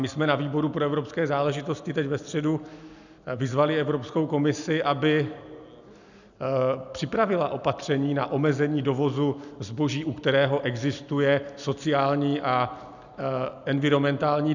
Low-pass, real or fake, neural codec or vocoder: 7.2 kHz; real; none